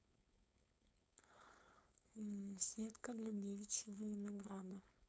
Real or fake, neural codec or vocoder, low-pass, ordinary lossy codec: fake; codec, 16 kHz, 4.8 kbps, FACodec; none; none